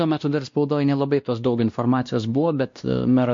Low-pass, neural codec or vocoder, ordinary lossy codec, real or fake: 7.2 kHz; codec, 16 kHz, 1 kbps, X-Codec, WavLM features, trained on Multilingual LibriSpeech; MP3, 48 kbps; fake